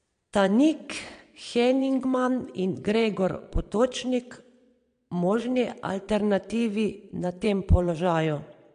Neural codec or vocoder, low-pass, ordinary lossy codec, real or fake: vocoder, 22.05 kHz, 80 mel bands, Vocos; 9.9 kHz; MP3, 64 kbps; fake